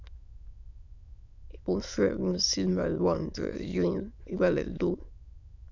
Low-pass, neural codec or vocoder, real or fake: 7.2 kHz; autoencoder, 22.05 kHz, a latent of 192 numbers a frame, VITS, trained on many speakers; fake